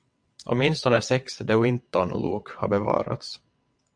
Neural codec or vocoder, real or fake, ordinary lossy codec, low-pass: vocoder, 22.05 kHz, 80 mel bands, WaveNeXt; fake; MP3, 64 kbps; 9.9 kHz